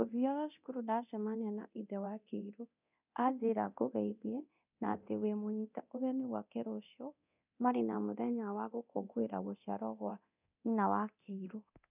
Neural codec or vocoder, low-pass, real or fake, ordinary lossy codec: codec, 24 kHz, 0.9 kbps, DualCodec; 3.6 kHz; fake; none